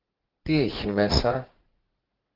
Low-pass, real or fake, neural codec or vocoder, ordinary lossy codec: 5.4 kHz; fake; vocoder, 44.1 kHz, 80 mel bands, Vocos; Opus, 16 kbps